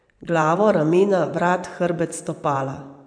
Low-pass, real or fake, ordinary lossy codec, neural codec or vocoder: 9.9 kHz; fake; none; vocoder, 24 kHz, 100 mel bands, Vocos